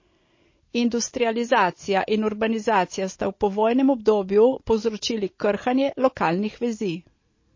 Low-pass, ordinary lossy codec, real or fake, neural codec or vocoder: 7.2 kHz; MP3, 32 kbps; real; none